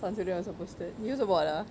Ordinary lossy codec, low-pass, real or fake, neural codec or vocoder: none; none; real; none